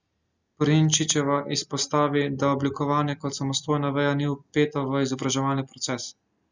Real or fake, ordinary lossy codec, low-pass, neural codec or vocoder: real; Opus, 64 kbps; 7.2 kHz; none